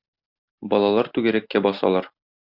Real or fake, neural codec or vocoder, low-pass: real; none; 5.4 kHz